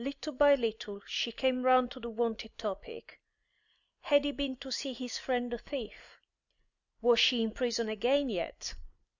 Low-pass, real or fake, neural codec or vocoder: 7.2 kHz; real; none